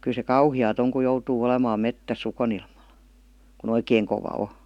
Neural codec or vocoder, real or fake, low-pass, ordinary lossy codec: none; real; 19.8 kHz; none